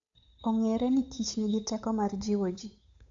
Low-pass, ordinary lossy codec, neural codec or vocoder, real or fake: 7.2 kHz; AAC, 48 kbps; codec, 16 kHz, 8 kbps, FunCodec, trained on Chinese and English, 25 frames a second; fake